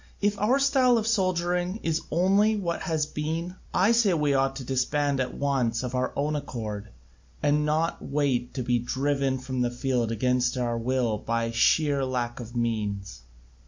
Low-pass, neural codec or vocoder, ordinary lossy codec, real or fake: 7.2 kHz; none; MP3, 48 kbps; real